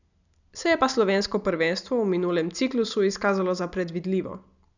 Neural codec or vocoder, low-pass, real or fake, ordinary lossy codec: none; 7.2 kHz; real; none